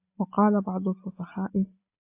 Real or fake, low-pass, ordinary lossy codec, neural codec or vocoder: real; 3.6 kHz; AAC, 24 kbps; none